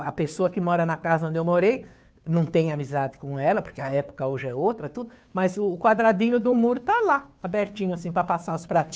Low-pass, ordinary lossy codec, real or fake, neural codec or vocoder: none; none; fake; codec, 16 kHz, 2 kbps, FunCodec, trained on Chinese and English, 25 frames a second